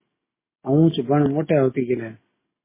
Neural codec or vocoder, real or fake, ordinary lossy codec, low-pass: none; real; MP3, 16 kbps; 3.6 kHz